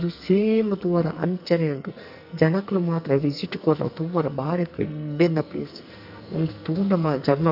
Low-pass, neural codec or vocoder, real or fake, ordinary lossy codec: 5.4 kHz; codec, 44.1 kHz, 2.6 kbps, SNAC; fake; none